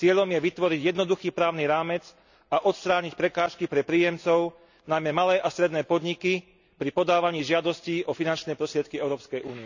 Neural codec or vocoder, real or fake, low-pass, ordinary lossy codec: none; real; 7.2 kHz; none